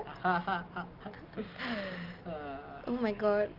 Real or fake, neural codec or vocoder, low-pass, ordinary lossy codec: real; none; 5.4 kHz; Opus, 32 kbps